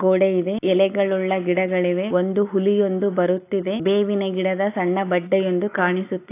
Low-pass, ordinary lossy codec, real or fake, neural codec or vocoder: 3.6 kHz; AAC, 24 kbps; real; none